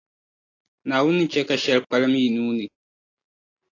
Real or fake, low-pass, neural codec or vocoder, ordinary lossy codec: real; 7.2 kHz; none; AAC, 32 kbps